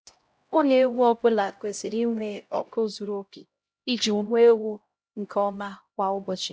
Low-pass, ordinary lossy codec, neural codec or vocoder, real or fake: none; none; codec, 16 kHz, 0.5 kbps, X-Codec, HuBERT features, trained on LibriSpeech; fake